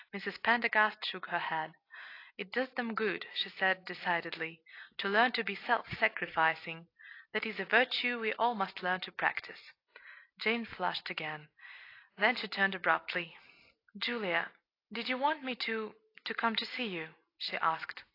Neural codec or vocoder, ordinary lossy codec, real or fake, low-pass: none; AAC, 32 kbps; real; 5.4 kHz